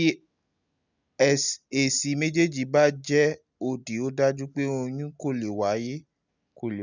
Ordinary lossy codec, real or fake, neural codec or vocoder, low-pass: none; real; none; 7.2 kHz